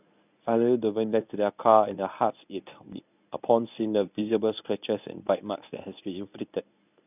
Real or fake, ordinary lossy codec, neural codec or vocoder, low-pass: fake; none; codec, 24 kHz, 0.9 kbps, WavTokenizer, medium speech release version 1; 3.6 kHz